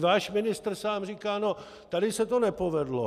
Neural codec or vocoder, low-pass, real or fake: none; 14.4 kHz; real